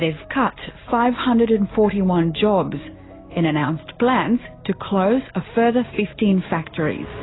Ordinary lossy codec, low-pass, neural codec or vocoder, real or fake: AAC, 16 kbps; 7.2 kHz; none; real